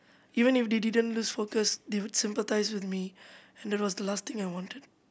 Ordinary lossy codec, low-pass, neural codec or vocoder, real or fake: none; none; none; real